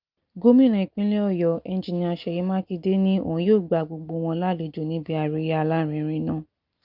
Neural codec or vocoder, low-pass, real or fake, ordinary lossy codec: none; 5.4 kHz; real; Opus, 24 kbps